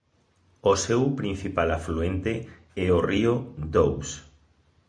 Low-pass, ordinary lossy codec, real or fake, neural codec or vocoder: 9.9 kHz; MP3, 64 kbps; real; none